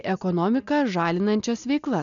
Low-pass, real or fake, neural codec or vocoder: 7.2 kHz; real; none